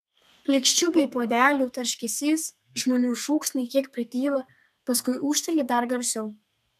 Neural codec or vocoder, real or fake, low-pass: codec, 32 kHz, 1.9 kbps, SNAC; fake; 14.4 kHz